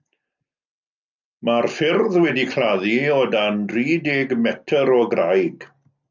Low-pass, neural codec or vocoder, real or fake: 7.2 kHz; none; real